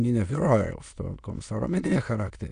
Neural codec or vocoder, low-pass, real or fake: autoencoder, 22.05 kHz, a latent of 192 numbers a frame, VITS, trained on many speakers; 9.9 kHz; fake